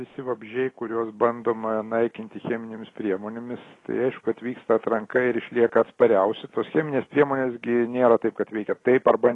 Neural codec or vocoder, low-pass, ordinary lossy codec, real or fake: none; 10.8 kHz; AAC, 32 kbps; real